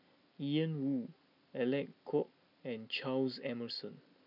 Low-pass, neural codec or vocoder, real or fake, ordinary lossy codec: 5.4 kHz; none; real; none